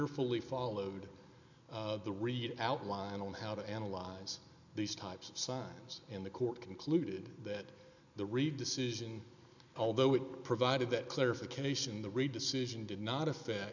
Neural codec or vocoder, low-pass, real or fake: none; 7.2 kHz; real